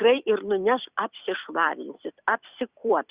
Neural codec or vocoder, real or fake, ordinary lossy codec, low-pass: vocoder, 44.1 kHz, 128 mel bands every 256 samples, BigVGAN v2; fake; Opus, 64 kbps; 3.6 kHz